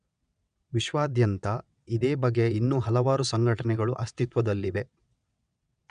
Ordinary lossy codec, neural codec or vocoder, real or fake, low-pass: MP3, 96 kbps; vocoder, 22.05 kHz, 80 mel bands, WaveNeXt; fake; 9.9 kHz